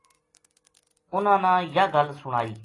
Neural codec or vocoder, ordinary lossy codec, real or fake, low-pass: none; AAC, 32 kbps; real; 10.8 kHz